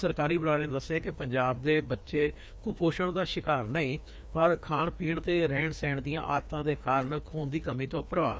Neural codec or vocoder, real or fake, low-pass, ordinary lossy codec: codec, 16 kHz, 2 kbps, FreqCodec, larger model; fake; none; none